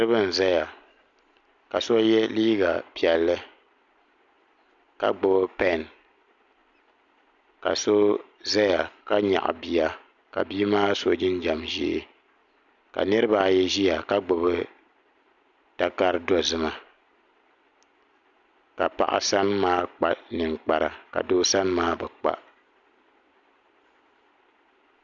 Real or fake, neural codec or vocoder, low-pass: real; none; 7.2 kHz